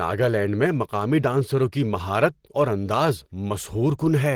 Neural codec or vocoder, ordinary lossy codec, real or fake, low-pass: none; Opus, 16 kbps; real; 19.8 kHz